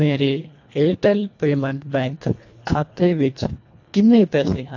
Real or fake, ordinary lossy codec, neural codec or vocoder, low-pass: fake; AAC, 48 kbps; codec, 24 kHz, 1.5 kbps, HILCodec; 7.2 kHz